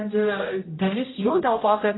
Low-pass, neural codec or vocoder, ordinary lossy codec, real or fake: 7.2 kHz; codec, 16 kHz, 0.5 kbps, X-Codec, HuBERT features, trained on general audio; AAC, 16 kbps; fake